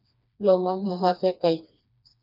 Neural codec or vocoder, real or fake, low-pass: codec, 16 kHz, 2 kbps, FreqCodec, smaller model; fake; 5.4 kHz